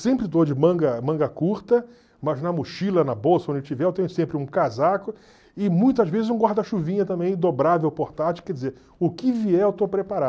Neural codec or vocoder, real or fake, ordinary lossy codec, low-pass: none; real; none; none